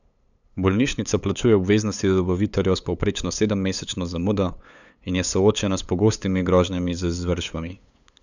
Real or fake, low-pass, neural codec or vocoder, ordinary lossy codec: fake; 7.2 kHz; codec, 16 kHz, 8 kbps, FunCodec, trained on LibriTTS, 25 frames a second; none